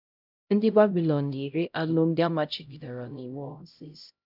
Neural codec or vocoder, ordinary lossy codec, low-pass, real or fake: codec, 16 kHz, 0.5 kbps, X-Codec, HuBERT features, trained on LibriSpeech; none; 5.4 kHz; fake